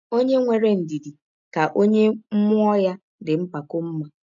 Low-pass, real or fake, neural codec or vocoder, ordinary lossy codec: 7.2 kHz; real; none; none